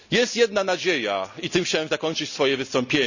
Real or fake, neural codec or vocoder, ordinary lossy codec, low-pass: real; none; none; 7.2 kHz